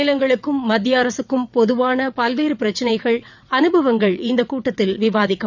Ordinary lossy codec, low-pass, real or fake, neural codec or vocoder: none; 7.2 kHz; fake; vocoder, 22.05 kHz, 80 mel bands, WaveNeXt